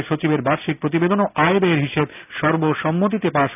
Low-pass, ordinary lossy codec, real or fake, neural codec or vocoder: 3.6 kHz; none; real; none